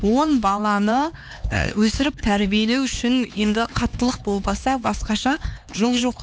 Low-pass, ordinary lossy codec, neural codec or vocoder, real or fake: none; none; codec, 16 kHz, 2 kbps, X-Codec, HuBERT features, trained on LibriSpeech; fake